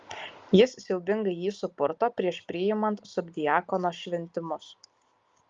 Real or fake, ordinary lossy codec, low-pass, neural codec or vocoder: real; Opus, 32 kbps; 7.2 kHz; none